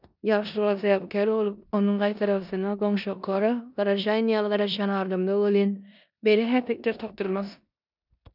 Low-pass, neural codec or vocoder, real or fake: 5.4 kHz; codec, 16 kHz in and 24 kHz out, 0.9 kbps, LongCat-Audio-Codec, four codebook decoder; fake